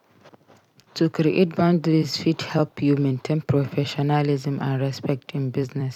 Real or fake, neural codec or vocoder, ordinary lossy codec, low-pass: fake; vocoder, 44.1 kHz, 128 mel bands every 256 samples, BigVGAN v2; none; 19.8 kHz